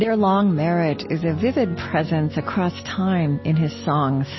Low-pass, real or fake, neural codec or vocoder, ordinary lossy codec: 7.2 kHz; real; none; MP3, 24 kbps